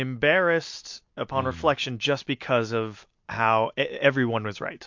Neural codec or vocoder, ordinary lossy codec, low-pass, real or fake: none; MP3, 48 kbps; 7.2 kHz; real